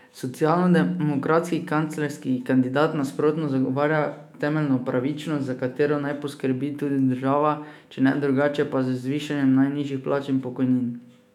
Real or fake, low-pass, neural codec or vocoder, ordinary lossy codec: fake; 19.8 kHz; autoencoder, 48 kHz, 128 numbers a frame, DAC-VAE, trained on Japanese speech; none